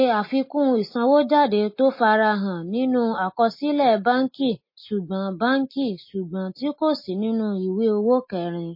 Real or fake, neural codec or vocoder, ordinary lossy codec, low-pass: real; none; MP3, 24 kbps; 5.4 kHz